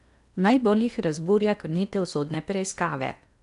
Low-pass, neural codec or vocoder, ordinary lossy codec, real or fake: 10.8 kHz; codec, 16 kHz in and 24 kHz out, 0.8 kbps, FocalCodec, streaming, 65536 codes; none; fake